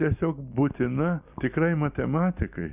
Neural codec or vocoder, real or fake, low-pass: none; real; 3.6 kHz